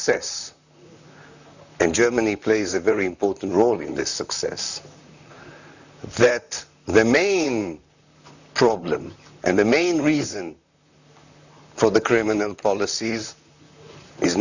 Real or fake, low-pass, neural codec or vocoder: fake; 7.2 kHz; vocoder, 44.1 kHz, 128 mel bands, Pupu-Vocoder